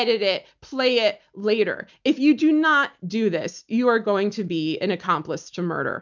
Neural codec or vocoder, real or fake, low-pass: none; real; 7.2 kHz